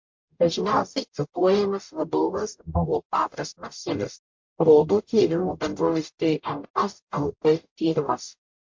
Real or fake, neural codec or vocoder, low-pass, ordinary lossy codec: fake; codec, 44.1 kHz, 0.9 kbps, DAC; 7.2 kHz; MP3, 48 kbps